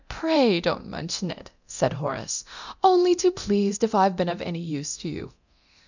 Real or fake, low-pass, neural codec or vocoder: fake; 7.2 kHz; codec, 24 kHz, 0.9 kbps, DualCodec